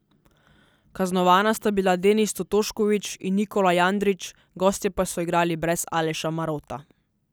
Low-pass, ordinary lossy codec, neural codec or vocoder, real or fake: none; none; none; real